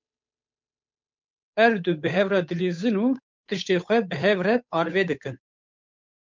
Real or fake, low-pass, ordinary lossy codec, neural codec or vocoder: fake; 7.2 kHz; MP3, 64 kbps; codec, 16 kHz, 8 kbps, FunCodec, trained on Chinese and English, 25 frames a second